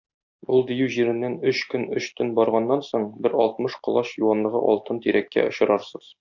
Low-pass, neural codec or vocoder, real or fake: 7.2 kHz; none; real